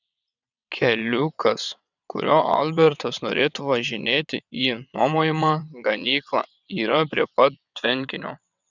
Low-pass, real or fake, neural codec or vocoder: 7.2 kHz; fake; vocoder, 22.05 kHz, 80 mel bands, WaveNeXt